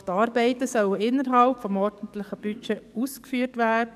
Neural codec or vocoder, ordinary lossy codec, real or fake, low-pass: codec, 44.1 kHz, 7.8 kbps, DAC; none; fake; 14.4 kHz